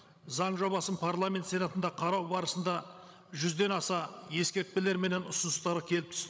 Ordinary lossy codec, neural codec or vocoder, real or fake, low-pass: none; codec, 16 kHz, 16 kbps, FreqCodec, larger model; fake; none